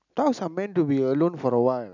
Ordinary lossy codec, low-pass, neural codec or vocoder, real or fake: none; 7.2 kHz; none; real